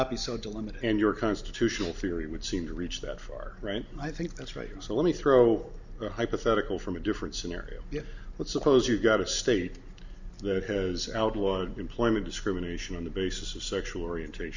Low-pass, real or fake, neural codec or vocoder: 7.2 kHz; real; none